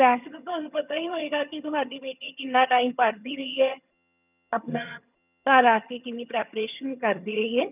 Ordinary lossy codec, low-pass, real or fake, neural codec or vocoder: none; 3.6 kHz; fake; vocoder, 22.05 kHz, 80 mel bands, HiFi-GAN